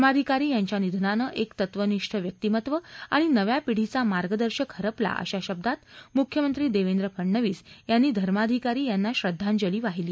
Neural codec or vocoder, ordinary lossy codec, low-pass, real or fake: none; none; none; real